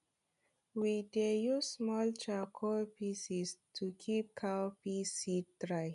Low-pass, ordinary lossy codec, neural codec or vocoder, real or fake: 10.8 kHz; none; none; real